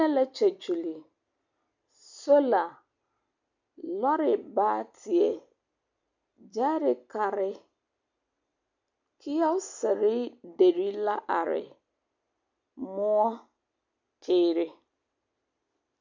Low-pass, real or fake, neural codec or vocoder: 7.2 kHz; real; none